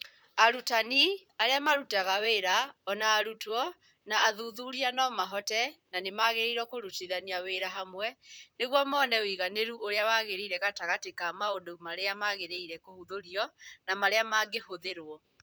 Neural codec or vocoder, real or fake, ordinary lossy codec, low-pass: vocoder, 44.1 kHz, 128 mel bands, Pupu-Vocoder; fake; none; none